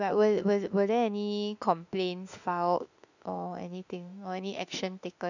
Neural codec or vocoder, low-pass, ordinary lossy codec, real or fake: autoencoder, 48 kHz, 32 numbers a frame, DAC-VAE, trained on Japanese speech; 7.2 kHz; none; fake